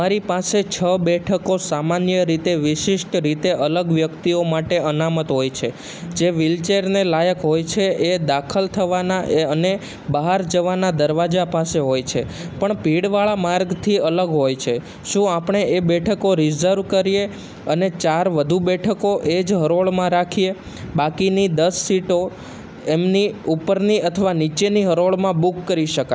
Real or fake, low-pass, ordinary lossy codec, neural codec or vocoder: real; none; none; none